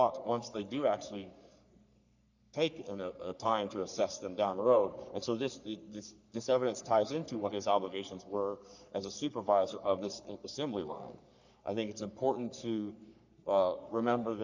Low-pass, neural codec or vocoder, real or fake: 7.2 kHz; codec, 44.1 kHz, 3.4 kbps, Pupu-Codec; fake